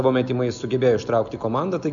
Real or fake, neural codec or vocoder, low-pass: real; none; 7.2 kHz